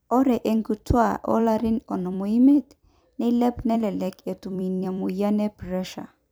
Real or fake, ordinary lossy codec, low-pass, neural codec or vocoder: fake; none; none; vocoder, 44.1 kHz, 128 mel bands every 256 samples, BigVGAN v2